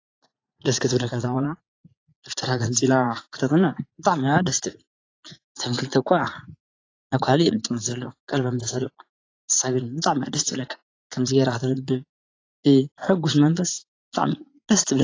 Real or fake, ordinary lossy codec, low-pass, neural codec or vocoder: fake; AAC, 32 kbps; 7.2 kHz; vocoder, 44.1 kHz, 80 mel bands, Vocos